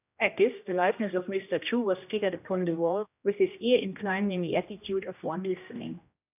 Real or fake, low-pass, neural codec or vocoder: fake; 3.6 kHz; codec, 16 kHz, 1 kbps, X-Codec, HuBERT features, trained on general audio